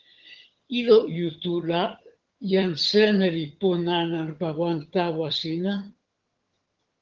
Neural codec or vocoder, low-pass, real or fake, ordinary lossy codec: vocoder, 22.05 kHz, 80 mel bands, HiFi-GAN; 7.2 kHz; fake; Opus, 16 kbps